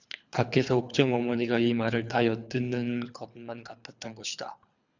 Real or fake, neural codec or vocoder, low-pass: fake; codec, 24 kHz, 3 kbps, HILCodec; 7.2 kHz